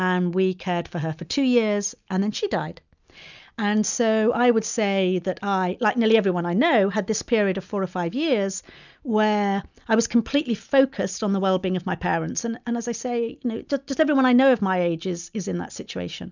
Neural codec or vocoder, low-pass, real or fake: none; 7.2 kHz; real